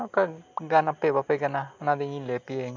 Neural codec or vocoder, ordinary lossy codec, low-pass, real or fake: none; MP3, 64 kbps; 7.2 kHz; real